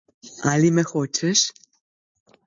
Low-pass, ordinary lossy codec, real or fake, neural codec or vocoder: 7.2 kHz; MP3, 48 kbps; real; none